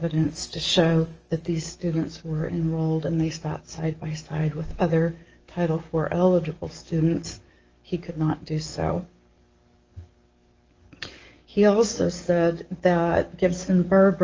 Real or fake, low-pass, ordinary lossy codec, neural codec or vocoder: fake; 7.2 kHz; Opus, 24 kbps; codec, 44.1 kHz, 7.8 kbps, DAC